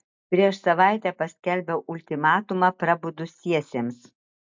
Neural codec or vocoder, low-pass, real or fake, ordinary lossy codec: none; 7.2 kHz; real; MP3, 64 kbps